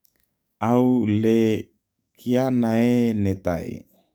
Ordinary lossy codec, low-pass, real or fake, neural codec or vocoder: none; none; fake; codec, 44.1 kHz, 7.8 kbps, DAC